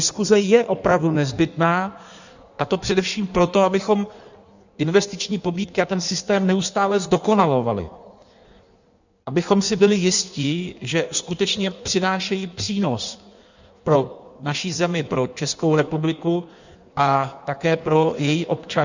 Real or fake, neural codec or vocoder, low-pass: fake; codec, 16 kHz in and 24 kHz out, 1.1 kbps, FireRedTTS-2 codec; 7.2 kHz